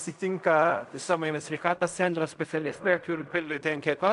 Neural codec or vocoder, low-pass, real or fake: codec, 16 kHz in and 24 kHz out, 0.4 kbps, LongCat-Audio-Codec, fine tuned four codebook decoder; 10.8 kHz; fake